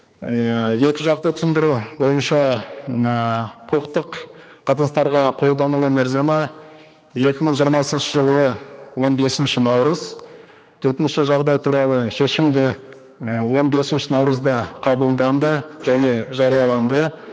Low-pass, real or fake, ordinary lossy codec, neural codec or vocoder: none; fake; none; codec, 16 kHz, 2 kbps, X-Codec, HuBERT features, trained on general audio